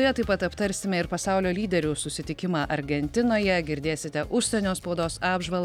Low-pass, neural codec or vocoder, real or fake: 19.8 kHz; none; real